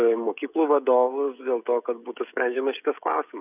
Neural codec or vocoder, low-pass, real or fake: codec, 16 kHz, 16 kbps, FreqCodec, smaller model; 3.6 kHz; fake